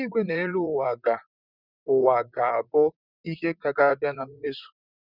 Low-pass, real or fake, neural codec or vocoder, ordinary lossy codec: 5.4 kHz; fake; vocoder, 44.1 kHz, 128 mel bands, Pupu-Vocoder; none